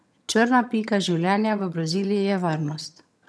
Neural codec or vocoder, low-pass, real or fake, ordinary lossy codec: vocoder, 22.05 kHz, 80 mel bands, HiFi-GAN; none; fake; none